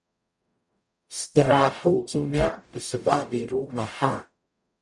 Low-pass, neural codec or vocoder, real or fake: 10.8 kHz; codec, 44.1 kHz, 0.9 kbps, DAC; fake